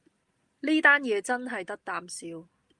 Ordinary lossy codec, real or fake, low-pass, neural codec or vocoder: Opus, 32 kbps; real; 10.8 kHz; none